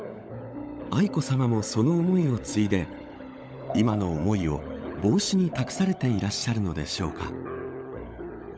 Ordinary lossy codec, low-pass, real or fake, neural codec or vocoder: none; none; fake; codec, 16 kHz, 16 kbps, FunCodec, trained on LibriTTS, 50 frames a second